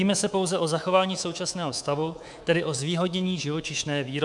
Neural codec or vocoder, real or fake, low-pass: codec, 24 kHz, 3.1 kbps, DualCodec; fake; 10.8 kHz